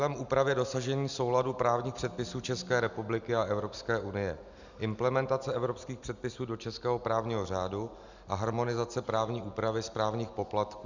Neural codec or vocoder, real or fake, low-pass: none; real; 7.2 kHz